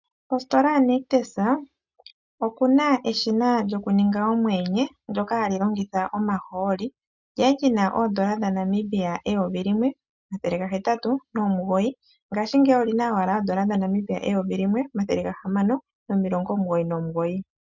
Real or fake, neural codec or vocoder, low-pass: real; none; 7.2 kHz